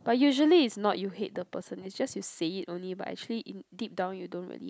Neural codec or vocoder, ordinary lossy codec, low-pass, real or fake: none; none; none; real